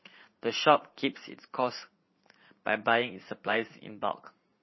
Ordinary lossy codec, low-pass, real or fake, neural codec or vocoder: MP3, 24 kbps; 7.2 kHz; fake; vocoder, 44.1 kHz, 80 mel bands, Vocos